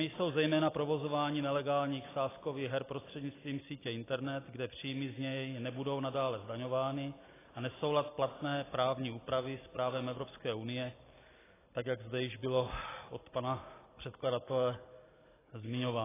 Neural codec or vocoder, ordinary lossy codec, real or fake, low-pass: none; AAC, 16 kbps; real; 3.6 kHz